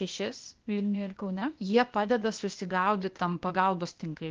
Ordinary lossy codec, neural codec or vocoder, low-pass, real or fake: Opus, 24 kbps; codec, 16 kHz, 0.8 kbps, ZipCodec; 7.2 kHz; fake